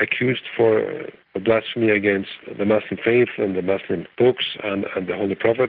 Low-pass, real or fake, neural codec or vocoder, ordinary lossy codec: 5.4 kHz; real; none; Opus, 32 kbps